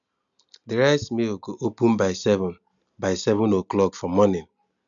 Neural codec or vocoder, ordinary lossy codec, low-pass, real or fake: none; none; 7.2 kHz; real